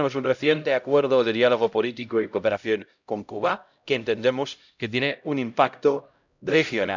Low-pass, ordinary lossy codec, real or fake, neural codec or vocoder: 7.2 kHz; none; fake; codec, 16 kHz, 0.5 kbps, X-Codec, HuBERT features, trained on LibriSpeech